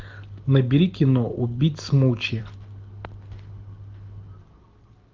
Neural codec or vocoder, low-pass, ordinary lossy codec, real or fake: none; 7.2 kHz; Opus, 24 kbps; real